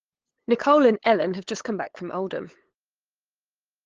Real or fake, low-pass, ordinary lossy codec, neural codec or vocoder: fake; 7.2 kHz; Opus, 16 kbps; codec, 16 kHz, 8 kbps, FunCodec, trained on LibriTTS, 25 frames a second